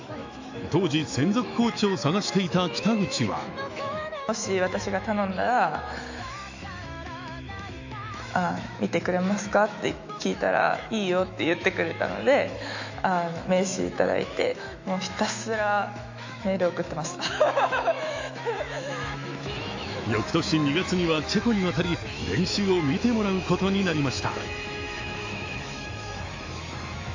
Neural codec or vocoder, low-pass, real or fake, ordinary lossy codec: autoencoder, 48 kHz, 128 numbers a frame, DAC-VAE, trained on Japanese speech; 7.2 kHz; fake; AAC, 48 kbps